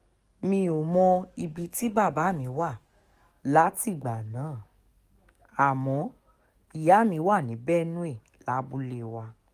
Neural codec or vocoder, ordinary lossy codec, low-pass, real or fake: codec, 44.1 kHz, 7.8 kbps, DAC; Opus, 32 kbps; 14.4 kHz; fake